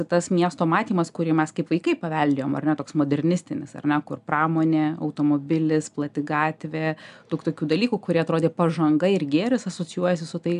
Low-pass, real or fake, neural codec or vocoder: 10.8 kHz; real; none